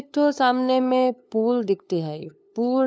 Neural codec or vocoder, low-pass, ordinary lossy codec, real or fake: codec, 16 kHz, 2 kbps, FunCodec, trained on LibriTTS, 25 frames a second; none; none; fake